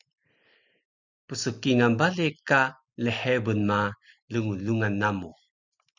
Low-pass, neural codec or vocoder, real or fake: 7.2 kHz; none; real